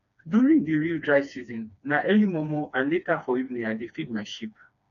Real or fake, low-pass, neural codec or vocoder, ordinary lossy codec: fake; 7.2 kHz; codec, 16 kHz, 2 kbps, FreqCodec, smaller model; none